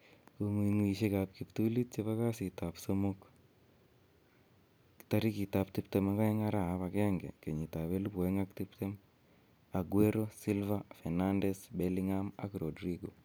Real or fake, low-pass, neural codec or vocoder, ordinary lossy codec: real; none; none; none